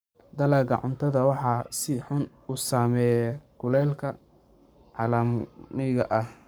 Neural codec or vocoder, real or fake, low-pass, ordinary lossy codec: codec, 44.1 kHz, 7.8 kbps, Pupu-Codec; fake; none; none